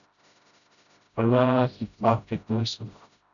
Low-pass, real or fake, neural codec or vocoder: 7.2 kHz; fake; codec, 16 kHz, 0.5 kbps, FreqCodec, smaller model